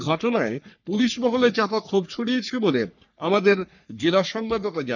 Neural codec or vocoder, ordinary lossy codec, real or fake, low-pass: codec, 44.1 kHz, 3.4 kbps, Pupu-Codec; none; fake; 7.2 kHz